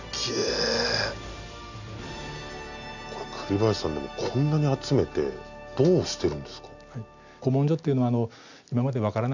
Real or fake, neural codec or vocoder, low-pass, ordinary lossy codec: real; none; 7.2 kHz; none